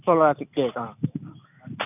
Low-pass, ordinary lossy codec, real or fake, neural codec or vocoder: 3.6 kHz; none; real; none